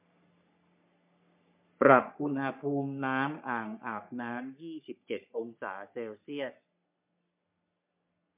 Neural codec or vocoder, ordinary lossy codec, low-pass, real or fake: codec, 44.1 kHz, 3.4 kbps, Pupu-Codec; MP3, 32 kbps; 3.6 kHz; fake